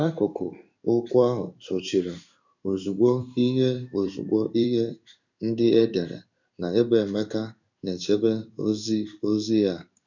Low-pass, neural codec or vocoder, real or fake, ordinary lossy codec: 7.2 kHz; codec, 16 kHz in and 24 kHz out, 1 kbps, XY-Tokenizer; fake; none